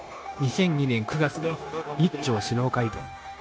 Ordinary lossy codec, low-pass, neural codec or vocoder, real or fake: none; none; codec, 16 kHz, 0.9 kbps, LongCat-Audio-Codec; fake